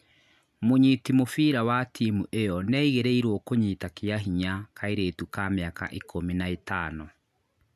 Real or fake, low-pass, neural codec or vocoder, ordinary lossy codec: real; 14.4 kHz; none; none